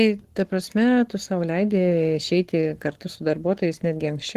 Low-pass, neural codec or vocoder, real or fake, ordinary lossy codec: 14.4 kHz; codec, 44.1 kHz, 7.8 kbps, Pupu-Codec; fake; Opus, 16 kbps